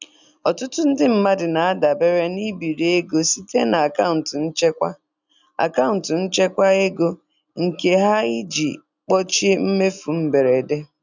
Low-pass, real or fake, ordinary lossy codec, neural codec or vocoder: 7.2 kHz; real; none; none